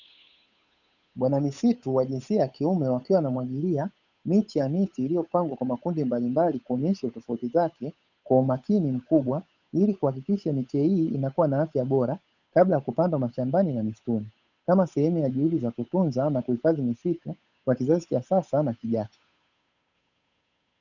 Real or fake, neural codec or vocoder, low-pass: fake; codec, 16 kHz, 8 kbps, FunCodec, trained on Chinese and English, 25 frames a second; 7.2 kHz